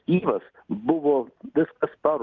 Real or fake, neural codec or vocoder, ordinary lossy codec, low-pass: real; none; Opus, 32 kbps; 7.2 kHz